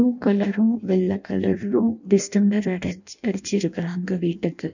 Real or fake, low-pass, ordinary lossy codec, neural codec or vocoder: fake; 7.2 kHz; none; codec, 16 kHz in and 24 kHz out, 0.6 kbps, FireRedTTS-2 codec